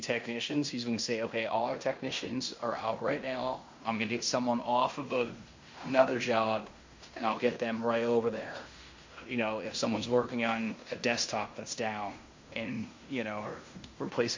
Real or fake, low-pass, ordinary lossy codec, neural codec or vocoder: fake; 7.2 kHz; MP3, 48 kbps; codec, 16 kHz in and 24 kHz out, 0.9 kbps, LongCat-Audio-Codec, fine tuned four codebook decoder